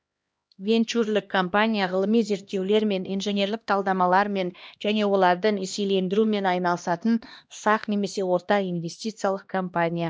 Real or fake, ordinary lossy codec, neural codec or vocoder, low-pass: fake; none; codec, 16 kHz, 1 kbps, X-Codec, HuBERT features, trained on LibriSpeech; none